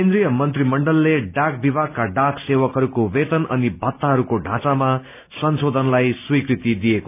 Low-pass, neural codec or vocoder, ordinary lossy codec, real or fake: 3.6 kHz; none; none; real